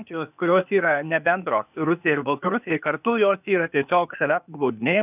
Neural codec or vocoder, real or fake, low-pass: codec, 16 kHz, 0.8 kbps, ZipCodec; fake; 3.6 kHz